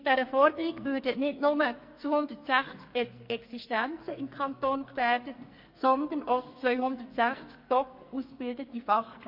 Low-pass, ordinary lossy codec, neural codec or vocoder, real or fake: 5.4 kHz; MP3, 32 kbps; codec, 44.1 kHz, 2.6 kbps, SNAC; fake